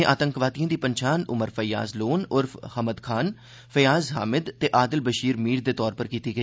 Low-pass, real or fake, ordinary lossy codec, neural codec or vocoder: none; real; none; none